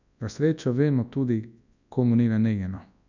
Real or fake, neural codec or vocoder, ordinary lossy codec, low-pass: fake; codec, 24 kHz, 0.9 kbps, WavTokenizer, large speech release; none; 7.2 kHz